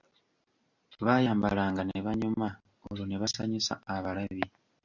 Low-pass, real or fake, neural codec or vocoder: 7.2 kHz; real; none